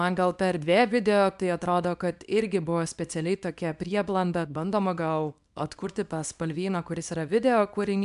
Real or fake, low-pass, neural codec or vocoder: fake; 10.8 kHz; codec, 24 kHz, 0.9 kbps, WavTokenizer, small release